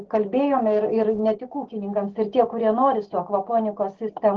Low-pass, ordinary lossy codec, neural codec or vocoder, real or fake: 7.2 kHz; Opus, 16 kbps; none; real